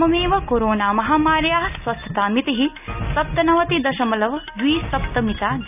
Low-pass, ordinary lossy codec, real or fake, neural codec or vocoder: 3.6 kHz; none; real; none